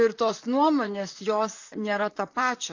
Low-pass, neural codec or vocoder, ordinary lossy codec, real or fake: 7.2 kHz; vocoder, 44.1 kHz, 128 mel bands, Pupu-Vocoder; AAC, 48 kbps; fake